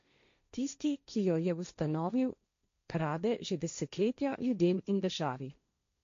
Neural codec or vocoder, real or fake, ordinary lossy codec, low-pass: codec, 16 kHz, 1.1 kbps, Voila-Tokenizer; fake; MP3, 48 kbps; 7.2 kHz